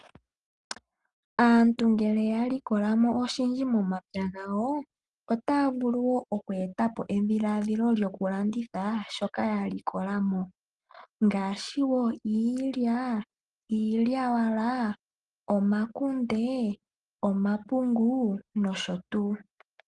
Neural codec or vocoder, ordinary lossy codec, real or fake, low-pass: none; Opus, 24 kbps; real; 10.8 kHz